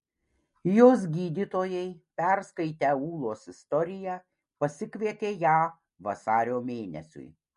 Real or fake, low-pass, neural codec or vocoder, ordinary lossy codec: real; 10.8 kHz; none; MP3, 48 kbps